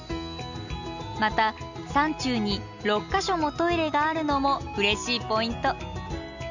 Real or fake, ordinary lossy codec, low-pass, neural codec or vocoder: real; none; 7.2 kHz; none